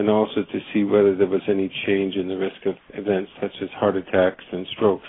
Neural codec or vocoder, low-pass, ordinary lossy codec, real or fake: none; 7.2 kHz; AAC, 16 kbps; real